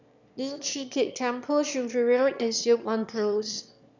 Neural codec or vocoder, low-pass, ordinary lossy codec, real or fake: autoencoder, 22.05 kHz, a latent of 192 numbers a frame, VITS, trained on one speaker; 7.2 kHz; none; fake